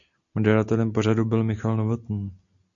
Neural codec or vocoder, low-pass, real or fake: none; 7.2 kHz; real